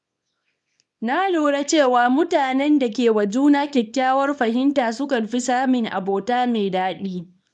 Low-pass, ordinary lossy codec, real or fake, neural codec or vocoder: 10.8 kHz; none; fake; codec, 24 kHz, 0.9 kbps, WavTokenizer, small release